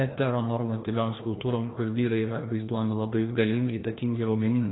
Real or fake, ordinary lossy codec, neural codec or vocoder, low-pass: fake; AAC, 16 kbps; codec, 16 kHz, 1 kbps, FreqCodec, larger model; 7.2 kHz